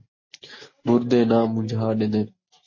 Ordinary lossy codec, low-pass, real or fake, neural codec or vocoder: MP3, 32 kbps; 7.2 kHz; real; none